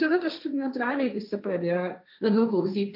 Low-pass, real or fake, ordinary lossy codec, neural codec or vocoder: 5.4 kHz; fake; AAC, 48 kbps; codec, 16 kHz, 1.1 kbps, Voila-Tokenizer